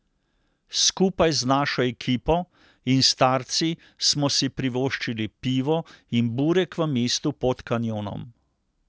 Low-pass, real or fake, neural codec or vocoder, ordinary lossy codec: none; real; none; none